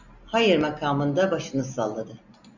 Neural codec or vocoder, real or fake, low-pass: none; real; 7.2 kHz